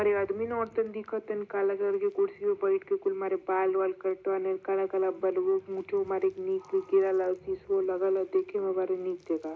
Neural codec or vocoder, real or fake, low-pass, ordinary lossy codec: none; real; none; none